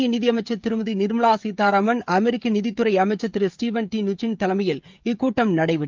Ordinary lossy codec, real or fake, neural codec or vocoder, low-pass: Opus, 24 kbps; fake; codec, 16 kHz, 16 kbps, FreqCodec, smaller model; 7.2 kHz